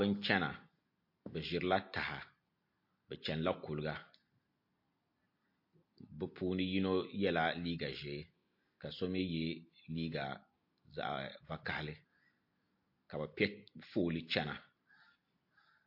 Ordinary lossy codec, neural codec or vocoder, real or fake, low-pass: MP3, 32 kbps; none; real; 5.4 kHz